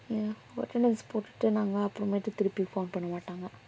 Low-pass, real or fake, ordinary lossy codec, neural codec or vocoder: none; real; none; none